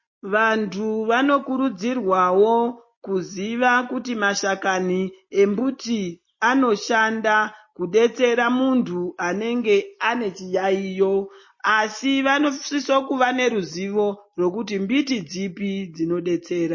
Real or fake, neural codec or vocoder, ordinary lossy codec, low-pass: real; none; MP3, 32 kbps; 7.2 kHz